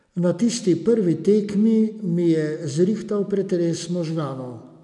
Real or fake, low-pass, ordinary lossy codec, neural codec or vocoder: real; 10.8 kHz; none; none